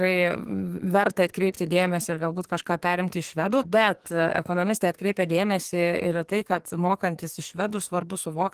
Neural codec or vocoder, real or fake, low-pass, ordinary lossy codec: codec, 44.1 kHz, 2.6 kbps, SNAC; fake; 14.4 kHz; Opus, 24 kbps